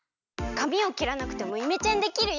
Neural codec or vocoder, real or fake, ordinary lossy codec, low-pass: none; real; none; 7.2 kHz